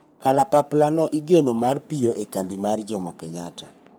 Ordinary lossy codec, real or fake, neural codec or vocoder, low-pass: none; fake; codec, 44.1 kHz, 3.4 kbps, Pupu-Codec; none